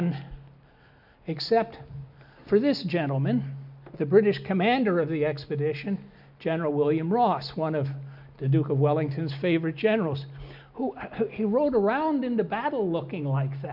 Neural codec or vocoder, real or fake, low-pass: none; real; 5.4 kHz